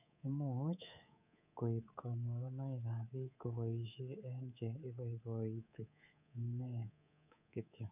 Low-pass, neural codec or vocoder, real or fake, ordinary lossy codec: 3.6 kHz; codec, 24 kHz, 3.1 kbps, DualCodec; fake; none